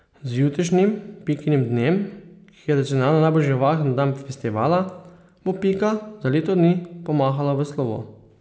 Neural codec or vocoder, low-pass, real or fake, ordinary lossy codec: none; none; real; none